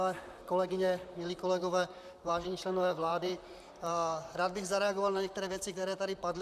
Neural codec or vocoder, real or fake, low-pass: vocoder, 44.1 kHz, 128 mel bands, Pupu-Vocoder; fake; 14.4 kHz